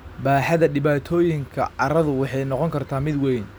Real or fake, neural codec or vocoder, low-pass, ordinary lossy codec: real; none; none; none